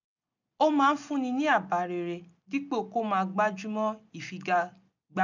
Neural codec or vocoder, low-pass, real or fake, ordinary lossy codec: none; 7.2 kHz; real; none